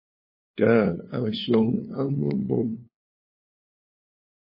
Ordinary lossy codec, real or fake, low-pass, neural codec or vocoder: MP3, 24 kbps; fake; 5.4 kHz; codec, 16 kHz, 2 kbps, FunCodec, trained on LibriTTS, 25 frames a second